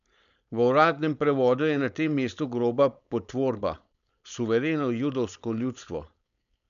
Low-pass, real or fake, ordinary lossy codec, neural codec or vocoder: 7.2 kHz; fake; none; codec, 16 kHz, 4.8 kbps, FACodec